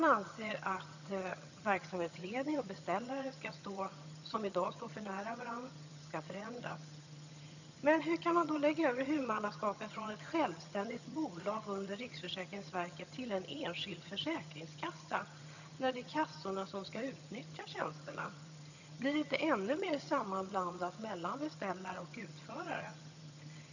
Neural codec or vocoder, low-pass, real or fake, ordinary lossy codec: vocoder, 22.05 kHz, 80 mel bands, HiFi-GAN; 7.2 kHz; fake; none